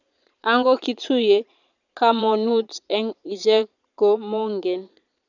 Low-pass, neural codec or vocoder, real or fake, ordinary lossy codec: 7.2 kHz; vocoder, 22.05 kHz, 80 mel bands, Vocos; fake; none